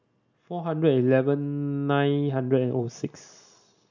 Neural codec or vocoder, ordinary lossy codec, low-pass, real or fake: none; none; 7.2 kHz; real